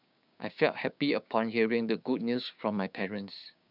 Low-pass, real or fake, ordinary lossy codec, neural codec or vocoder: 5.4 kHz; fake; none; codec, 16 kHz, 6 kbps, DAC